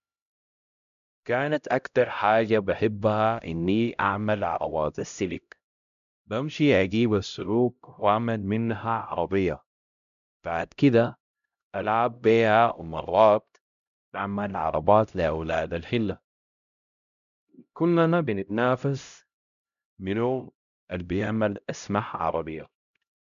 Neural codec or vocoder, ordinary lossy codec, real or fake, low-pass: codec, 16 kHz, 0.5 kbps, X-Codec, HuBERT features, trained on LibriSpeech; none; fake; 7.2 kHz